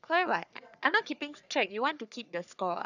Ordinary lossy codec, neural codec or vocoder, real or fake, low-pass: none; codec, 44.1 kHz, 3.4 kbps, Pupu-Codec; fake; 7.2 kHz